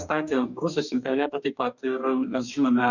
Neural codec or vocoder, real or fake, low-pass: codec, 44.1 kHz, 2.6 kbps, DAC; fake; 7.2 kHz